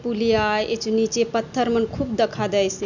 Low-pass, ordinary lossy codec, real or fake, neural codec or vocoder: 7.2 kHz; none; real; none